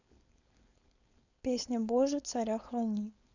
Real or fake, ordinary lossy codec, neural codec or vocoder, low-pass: fake; none; codec, 16 kHz, 4.8 kbps, FACodec; 7.2 kHz